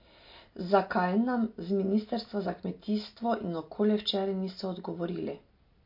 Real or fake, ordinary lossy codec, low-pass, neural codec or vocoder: real; MP3, 32 kbps; 5.4 kHz; none